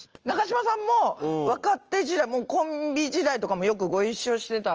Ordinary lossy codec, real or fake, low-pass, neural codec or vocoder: Opus, 24 kbps; real; 7.2 kHz; none